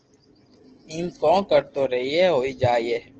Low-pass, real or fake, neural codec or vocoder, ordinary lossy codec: 7.2 kHz; real; none; Opus, 16 kbps